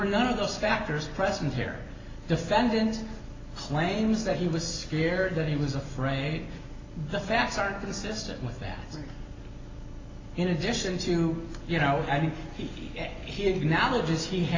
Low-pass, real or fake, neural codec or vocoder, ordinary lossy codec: 7.2 kHz; real; none; AAC, 32 kbps